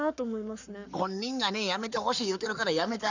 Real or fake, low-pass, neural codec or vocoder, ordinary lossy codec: fake; 7.2 kHz; codec, 44.1 kHz, 7.8 kbps, Pupu-Codec; none